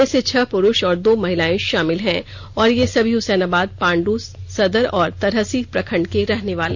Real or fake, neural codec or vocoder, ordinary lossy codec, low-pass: real; none; none; none